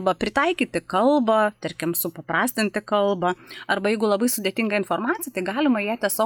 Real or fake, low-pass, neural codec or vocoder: real; 19.8 kHz; none